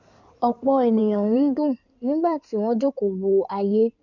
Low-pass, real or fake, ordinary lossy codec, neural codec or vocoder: 7.2 kHz; fake; none; codec, 16 kHz in and 24 kHz out, 2.2 kbps, FireRedTTS-2 codec